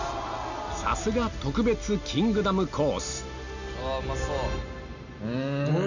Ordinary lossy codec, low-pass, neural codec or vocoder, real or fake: none; 7.2 kHz; none; real